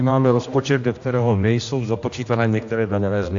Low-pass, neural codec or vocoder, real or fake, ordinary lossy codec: 7.2 kHz; codec, 16 kHz, 1 kbps, X-Codec, HuBERT features, trained on general audio; fake; AAC, 64 kbps